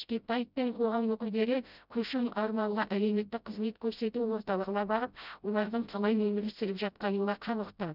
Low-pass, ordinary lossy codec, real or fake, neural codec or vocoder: 5.4 kHz; none; fake; codec, 16 kHz, 0.5 kbps, FreqCodec, smaller model